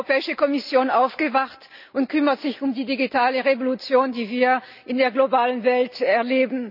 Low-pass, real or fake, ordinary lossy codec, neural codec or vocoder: 5.4 kHz; real; none; none